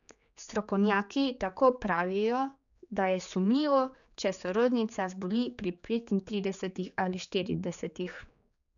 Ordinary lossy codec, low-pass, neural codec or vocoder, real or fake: none; 7.2 kHz; codec, 16 kHz, 4 kbps, X-Codec, HuBERT features, trained on general audio; fake